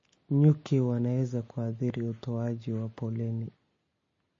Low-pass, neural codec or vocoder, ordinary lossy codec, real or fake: 7.2 kHz; none; MP3, 32 kbps; real